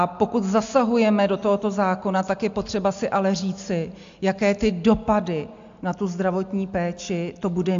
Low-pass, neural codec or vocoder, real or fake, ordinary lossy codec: 7.2 kHz; none; real; AAC, 64 kbps